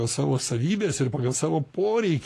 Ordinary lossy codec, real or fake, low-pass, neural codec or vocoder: AAC, 48 kbps; fake; 14.4 kHz; codec, 44.1 kHz, 7.8 kbps, Pupu-Codec